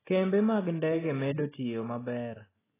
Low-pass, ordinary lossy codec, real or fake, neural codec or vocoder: 3.6 kHz; AAC, 16 kbps; real; none